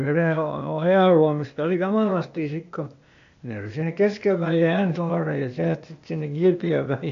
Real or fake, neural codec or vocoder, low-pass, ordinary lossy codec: fake; codec, 16 kHz, 0.8 kbps, ZipCodec; 7.2 kHz; MP3, 64 kbps